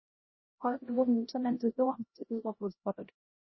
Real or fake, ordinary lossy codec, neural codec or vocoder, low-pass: fake; MP3, 24 kbps; codec, 16 kHz, 0.5 kbps, X-Codec, HuBERT features, trained on LibriSpeech; 7.2 kHz